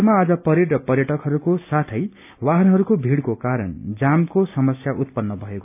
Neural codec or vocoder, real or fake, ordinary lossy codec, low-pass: none; real; none; 3.6 kHz